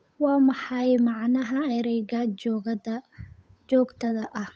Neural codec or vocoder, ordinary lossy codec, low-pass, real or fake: codec, 16 kHz, 8 kbps, FunCodec, trained on Chinese and English, 25 frames a second; none; none; fake